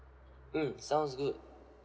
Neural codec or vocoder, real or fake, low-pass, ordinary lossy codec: none; real; none; none